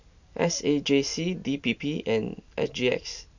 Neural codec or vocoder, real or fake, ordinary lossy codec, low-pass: none; real; none; 7.2 kHz